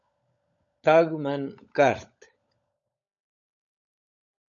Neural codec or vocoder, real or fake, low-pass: codec, 16 kHz, 16 kbps, FunCodec, trained on LibriTTS, 50 frames a second; fake; 7.2 kHz